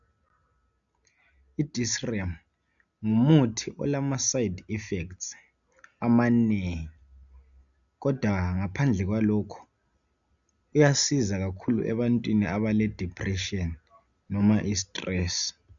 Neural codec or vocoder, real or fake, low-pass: none; real; 7.2 kHz